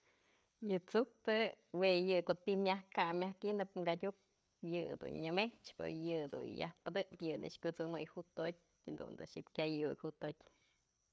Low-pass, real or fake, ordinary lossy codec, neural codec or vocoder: none; fake; none; codec, 16 kHz, 4 kbps, FreqCodec, larger model